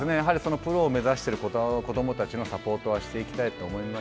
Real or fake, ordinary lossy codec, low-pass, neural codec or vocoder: real; none; none; none